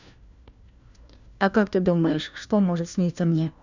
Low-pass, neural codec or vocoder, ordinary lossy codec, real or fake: 7.2 kHz; codec, 16 kHz, 1 kbps, FunCodec, trained on LibriTTS, 50 frames a second; none; fake